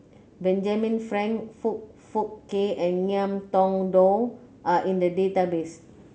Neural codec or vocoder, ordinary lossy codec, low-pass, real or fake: none; none; none; real